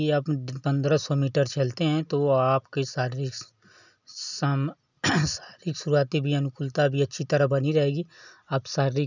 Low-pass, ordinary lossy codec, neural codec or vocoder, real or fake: 7.2 kHz; none; none; real